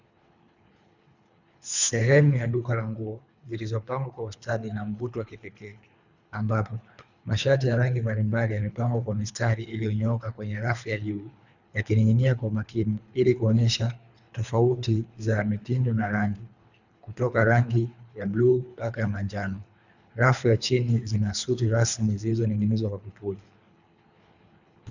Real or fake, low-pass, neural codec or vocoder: fake; 7.2 kHz; codec, 24 kHz, 3 kbps, HILCodec